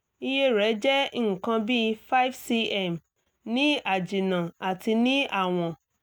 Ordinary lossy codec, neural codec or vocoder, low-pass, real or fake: none; none; none; real